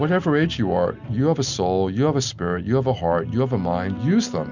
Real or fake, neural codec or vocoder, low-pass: real; none; 7.2 kHz